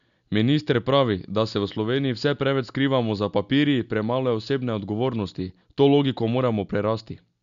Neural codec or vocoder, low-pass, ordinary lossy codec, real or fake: none; 7.2 kHz; none; real